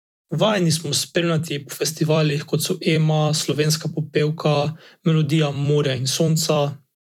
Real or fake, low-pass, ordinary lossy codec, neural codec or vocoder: fake; 19.8 kHz; none; vocoder, 44.1 kHz, 128 mel bands every 256 samples, BigVGAN v2